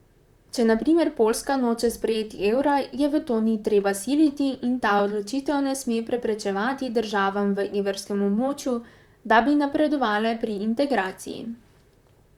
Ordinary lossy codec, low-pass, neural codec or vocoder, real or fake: Opus, 64 kbps; 19.8 kHz; vocoder, 44.1 kHz, 128 mel bands, Pupu-Vocoder; fake